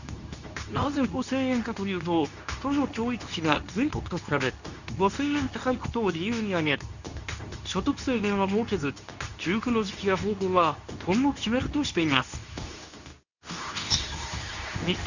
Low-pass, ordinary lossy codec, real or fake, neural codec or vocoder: 7.2 kHz; none; fake; codec, 24 kHz, 0.9 kbps, WavTokenizer, medium speech release version 2